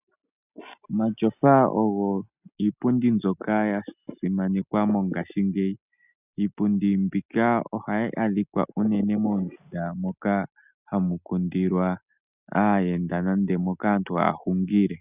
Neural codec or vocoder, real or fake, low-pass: none; real; 3.6 kHz